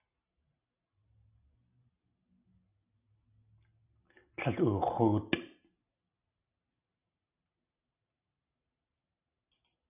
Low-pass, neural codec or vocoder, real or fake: 3.6 kHz; none; real